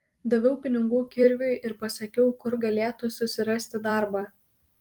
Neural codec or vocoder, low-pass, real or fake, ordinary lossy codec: vocoder, 44.1 kHz, 128 mel bands every 256 samples, BigVGAN v2; 19.8 kHz; fake; Opus, 32 kbps